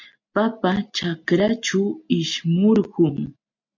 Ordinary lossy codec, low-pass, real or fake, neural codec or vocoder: MP3, 48 kbps; 7.2 kHz; real; none